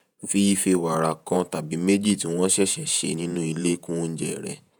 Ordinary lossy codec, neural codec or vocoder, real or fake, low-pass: none; vocoder, 48 kHz, 128 mel bands, Vocos; fake; none